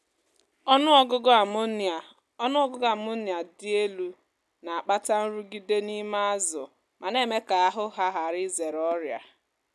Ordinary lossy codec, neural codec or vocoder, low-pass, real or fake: none; none; none; real